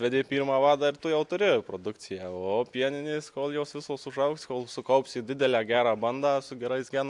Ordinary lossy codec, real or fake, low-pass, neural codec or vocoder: AAC, 64 kbps; real; 10.8 kHz; none